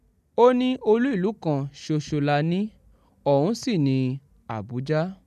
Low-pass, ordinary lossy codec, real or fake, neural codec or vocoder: 14.4 kHz; none; real; none